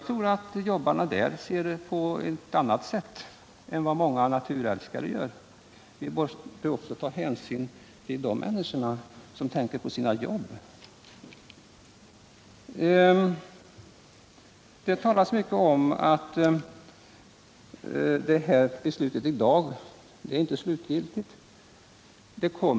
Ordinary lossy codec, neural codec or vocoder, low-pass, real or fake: none; none; none; real